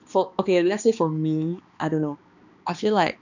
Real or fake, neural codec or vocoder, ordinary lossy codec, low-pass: fake; codec, 16 kHz, 2 kbps, X-Codec, HuBERT features, trained on balanced general audio; none; 7.2 kHz